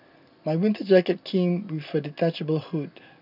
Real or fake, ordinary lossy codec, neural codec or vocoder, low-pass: real; none; none; 5.4 kHz